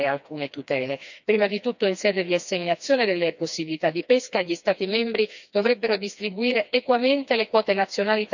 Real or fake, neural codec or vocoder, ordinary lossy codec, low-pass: fake; codec, 16 kHz, 2 kbps, FreqCodec, smaller model; none; 7.2 kHz